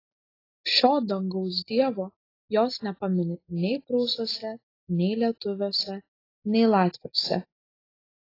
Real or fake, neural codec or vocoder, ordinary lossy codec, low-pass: real; none; AAC, 24 kbps; 5.4 kHz